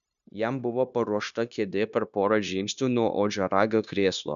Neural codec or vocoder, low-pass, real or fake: codec, 16 kHz, 0.9 kbps, LongCat-Audio-Codec; 7.2 kHz; fake